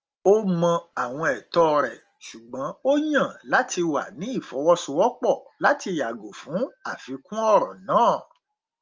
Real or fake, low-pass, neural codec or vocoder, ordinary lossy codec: real; 7.2 kHz; none; Opus, 24 kbps